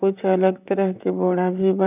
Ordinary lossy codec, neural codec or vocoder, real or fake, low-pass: none; vocoder, 44.1 kHz, 128 mel bands, Pupu-Vocoder; fake; 3.6 kHz